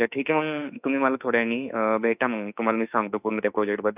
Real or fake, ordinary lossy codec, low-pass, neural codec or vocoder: fake; none; 3.6 kHz; autoencoder, 48 kHz, 32 numbers a frame, DAC-VAE, trained on Japanese speech